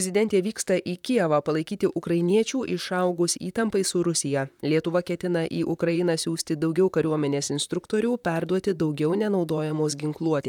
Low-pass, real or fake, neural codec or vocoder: 19.8 kHz; fake; vocoder, 44.1 kHz, 128 mel bands, Pupu-Vocoder